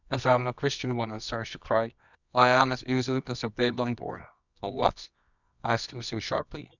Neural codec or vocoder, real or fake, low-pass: codec, 24 kHz, 0.9 kbps, WavTokenizer, medium music audio release; fake; 7.2 kHz